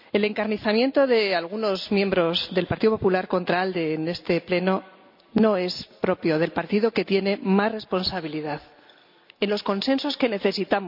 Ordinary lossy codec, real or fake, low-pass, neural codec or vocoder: none; real; 5.4 kHz; none